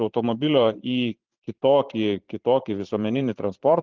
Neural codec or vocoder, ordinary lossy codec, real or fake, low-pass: vocoder, 44.1 kHz, 128 mel bands every 512 samples, BigVGAN v2; Opus, 24 kbps; fake; 7.2 kHz